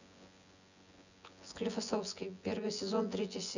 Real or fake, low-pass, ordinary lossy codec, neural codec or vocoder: fake; 7.2 kHz; none; vocoder, 24 kHz, 100 mel bands, Vocos